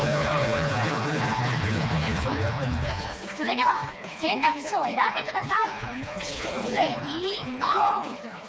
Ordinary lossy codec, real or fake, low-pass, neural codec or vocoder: none; fake; none; codec, 16 kHz, 2 kbps, FreqCodec, smaller model